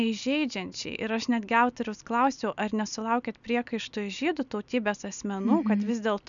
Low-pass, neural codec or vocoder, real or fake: 7.2 kHz; none; real